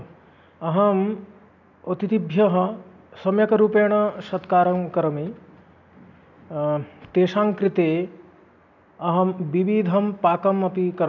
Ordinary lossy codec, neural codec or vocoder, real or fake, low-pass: none; none; real; 7.2 kHz